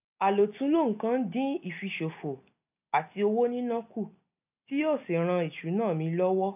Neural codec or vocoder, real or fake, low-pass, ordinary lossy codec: none; real; 3.6 kHz; none